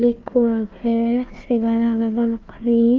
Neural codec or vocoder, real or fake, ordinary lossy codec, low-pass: codec, 16 kHz, 1 kbps, FunCodec, trained on Chinese and English, 50 frames a second; fake; Opus, 32 kbps; 7.2 kHz